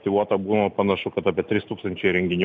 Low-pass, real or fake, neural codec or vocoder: 7.2 kHz; real; none